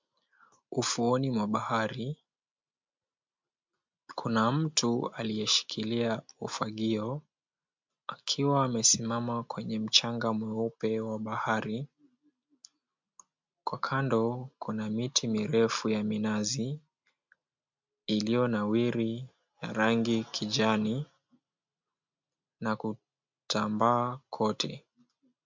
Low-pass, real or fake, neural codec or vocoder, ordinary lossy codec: 7.2 kHz; real; none; MP3, 64 kbps